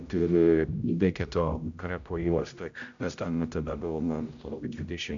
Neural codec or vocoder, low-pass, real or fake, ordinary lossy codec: codec, 16 kHz, 0.5 kbps, X-Codec, HuBERT features, trained on general audio; 7.2 kHz; fake; AAC, 64 kbps